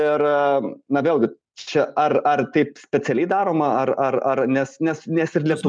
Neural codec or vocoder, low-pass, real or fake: none; 9.9 kHz; real